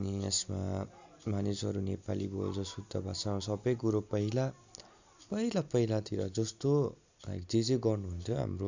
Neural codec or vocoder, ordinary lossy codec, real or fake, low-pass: none; Opus, 64 kbps; real; 7.2 kHz